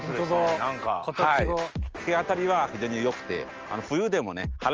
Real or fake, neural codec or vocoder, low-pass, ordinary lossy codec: real; none; 7.2 kHz; Opus, 24 kbps